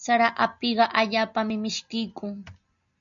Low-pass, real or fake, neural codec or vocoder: 7.2 kHz; real; none